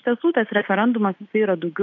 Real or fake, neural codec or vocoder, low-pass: real; none; 7.2 kHz